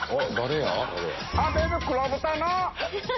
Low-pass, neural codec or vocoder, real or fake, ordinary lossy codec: 7.2 kHz; none; real; MP3, 24 kbps